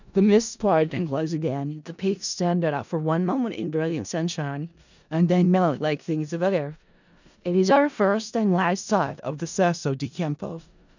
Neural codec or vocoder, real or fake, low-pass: codec, 16 kHz in and 24 kHz out, 0.4 kbps, LongCat-Audio-Codec, four codebook decoder; fake; 7.2 kHz